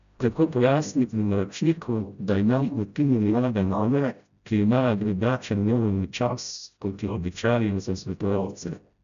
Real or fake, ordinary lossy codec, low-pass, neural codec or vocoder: fake; none; 7.2 kHz; codec, 16 kHz, 0.5 kbps, FreqCodec, smaller model